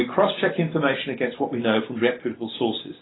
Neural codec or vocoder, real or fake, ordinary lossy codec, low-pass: none; real; AAC, 16 kbps; 7.2 kHz